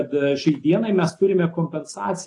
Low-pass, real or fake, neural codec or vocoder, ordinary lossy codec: 10.8 kHz; real; none; AAC, 48 kbps